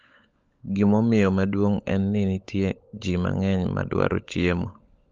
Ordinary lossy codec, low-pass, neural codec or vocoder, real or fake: Opus, 24 kbps; 7.2 kHz; codec, 16 kHz, 16 kbps, FunCodec, trained on LibriTTS, 50 frames a second; fake